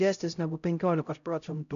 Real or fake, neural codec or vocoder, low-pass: fake; codec, 16 kHz, 0.5 kbps, X-Codec, HuBERT features, trained on LibriSpeech; 7.2 kHz